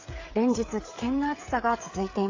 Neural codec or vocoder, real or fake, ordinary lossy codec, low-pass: codec, 16 kHz, 16 kbps, FreqCodec, smaller model; fake; AAC, 32 kbps; 7.2 kHz